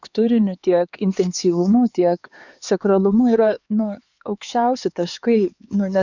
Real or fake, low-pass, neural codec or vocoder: fake; 7.2 kHz; codec, 16 kHz, 4 kbps, X-Codec, HuBERT features, trained on LibriSpeech